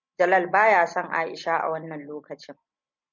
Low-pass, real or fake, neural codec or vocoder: 7.2 kHz; real; none